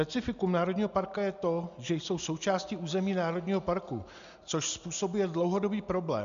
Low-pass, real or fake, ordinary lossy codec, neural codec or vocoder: 7.2 kHz; real; AAC, 96 kbps; none